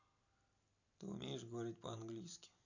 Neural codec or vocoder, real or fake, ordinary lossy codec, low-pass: none; real; none; 7.2 kHz